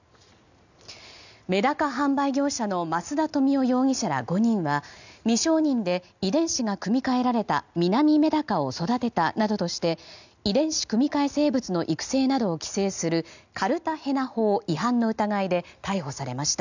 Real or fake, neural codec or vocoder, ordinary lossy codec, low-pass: real; none; none; 7.2 kHz